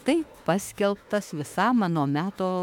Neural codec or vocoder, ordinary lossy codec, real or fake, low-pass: autoencoder, 48 kHz, 32 numbers a frame, DAC-VAE, trained on Japanese speech; MP3, 96 kbps; fake; 19.8 kHz